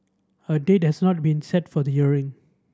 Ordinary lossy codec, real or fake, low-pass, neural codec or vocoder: none; real; none; none